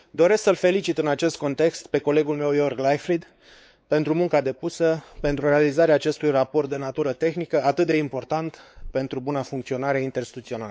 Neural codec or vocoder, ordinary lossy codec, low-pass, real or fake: codec, 16 kHz, 4 kbps, X-Codec, WavLM features, trained on Multilingual LibriSpeech; none; none; fake